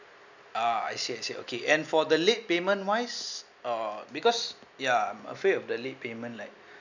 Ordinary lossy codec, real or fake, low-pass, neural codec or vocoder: none; real; 7.2 kHz; none